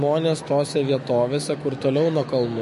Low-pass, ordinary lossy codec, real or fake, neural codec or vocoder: 14.4 kHz; MP3, 48 kbps; fake; autoencoder, 48 kHz, 128 numbers a frame, DAC-VAE, trained on Japanese speech